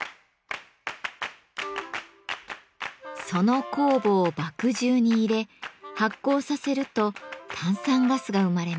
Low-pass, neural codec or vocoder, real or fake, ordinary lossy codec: none; none; real; none